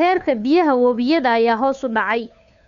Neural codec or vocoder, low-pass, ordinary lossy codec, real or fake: codec, 16 kHz, 2 kbps, FunCodec, trained on Chinese and English, 25 frames a second; 7.2 kHz; MP3, 96 kbps; fake